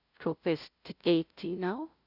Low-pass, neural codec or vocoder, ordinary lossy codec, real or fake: 5.4 kHz; codec, 16 kHz, 0.5 kbps, FunCodec, trained on LibriTTS, 25 frames a second; none; fake